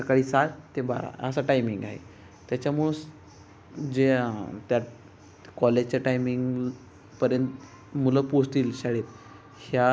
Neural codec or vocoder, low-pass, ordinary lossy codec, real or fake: none; none; none; real